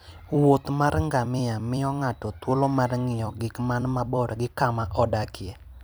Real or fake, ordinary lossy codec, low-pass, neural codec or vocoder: fake; none; none; vocoder, 44.1 kHz, 128 mel bands every 256 samples, BigVGAN v2